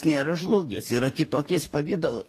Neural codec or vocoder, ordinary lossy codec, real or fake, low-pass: codec, 44.1 kHz, 2.6 kbps, DAC; AAC, 48 kbps; fake; 14.4 kHz